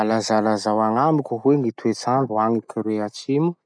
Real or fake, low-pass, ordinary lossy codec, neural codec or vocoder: real; 9.9 kHz; none; none